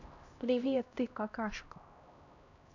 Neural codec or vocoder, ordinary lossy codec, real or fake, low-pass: codec, 16 kHz, 1 kbps, X-Codec, HuBERT features, trained on LibriSpeech; none; fake; 7.2 kHz